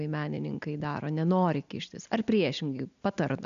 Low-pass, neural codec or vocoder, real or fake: 7.2 kHz; none; real